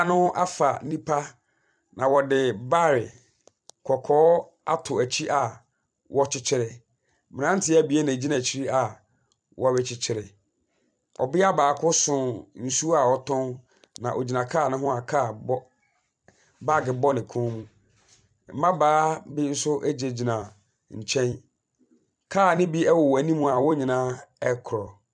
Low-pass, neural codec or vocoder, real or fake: 9.9 kHz; vocoder, 44.1 kHz, 128 mel bands every 256 samples, BigVGAN v2; fake